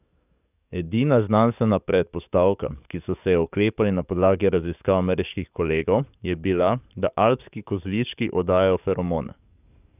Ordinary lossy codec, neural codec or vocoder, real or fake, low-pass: none; codec, 44.1 kHz, 7.8 kbps, DAC; fake; 3.6 kHz